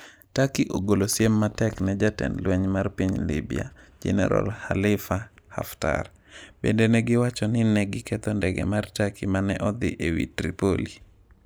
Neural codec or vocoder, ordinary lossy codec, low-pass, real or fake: none; none; none; real